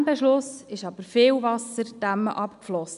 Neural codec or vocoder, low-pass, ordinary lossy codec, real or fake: none; 10.8 kHz; none; real